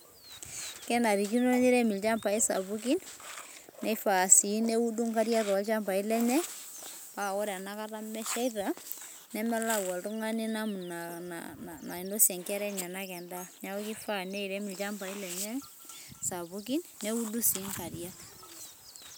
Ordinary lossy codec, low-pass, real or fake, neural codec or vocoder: none; none; real; none